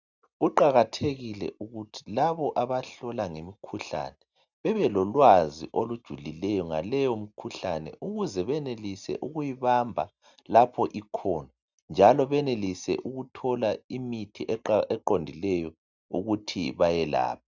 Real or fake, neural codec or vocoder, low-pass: real; none; 7.2 kHz